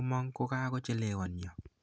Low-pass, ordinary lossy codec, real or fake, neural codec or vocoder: none; none; real; none